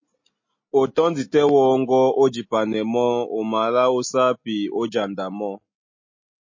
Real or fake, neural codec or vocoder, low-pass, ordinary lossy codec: real; none; 7.2 kHz; MP3, 32 kbps